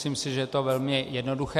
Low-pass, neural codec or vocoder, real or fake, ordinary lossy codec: 14.4 kHz; none; real; MP3, 64 kbps